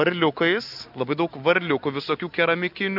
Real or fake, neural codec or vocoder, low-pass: real; none; 5.4 kHz